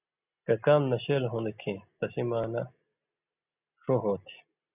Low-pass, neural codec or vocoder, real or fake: 3.6 kHz; none; real